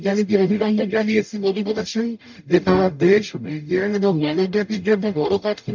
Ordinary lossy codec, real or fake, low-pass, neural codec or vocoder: MP3, 64 kbps; fake; 7.2 kHz; codec, 44.1 kHz, 0.9 kbps, DAC